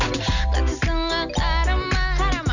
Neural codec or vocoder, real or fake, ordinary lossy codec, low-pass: none; real; none; 7.2 kHz